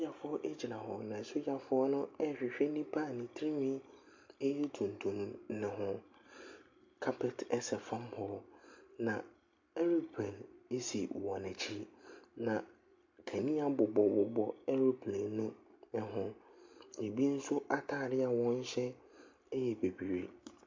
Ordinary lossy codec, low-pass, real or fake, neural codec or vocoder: MP3, 48 kbps; 7.2 kHz; real; none